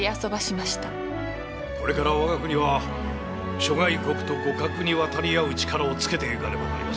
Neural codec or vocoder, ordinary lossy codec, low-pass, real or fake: none; none; none; real